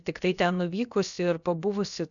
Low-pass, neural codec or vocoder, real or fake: 7.2 kHz; codec, 16 kHz, about 1 kbps, DyCAST, with the encoder's durations; fake